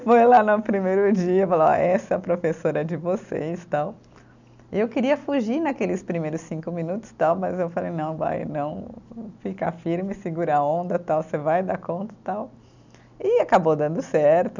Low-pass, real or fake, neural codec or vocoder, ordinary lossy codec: 7.2 kHz; real; none; none